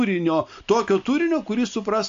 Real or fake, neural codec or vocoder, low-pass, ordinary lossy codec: real; none; 7.2 kHz; AAC, 64 kbps